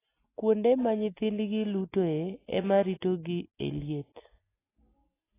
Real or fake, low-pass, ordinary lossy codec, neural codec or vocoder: real; 3.6 kHz; AAC, 16 kbps; none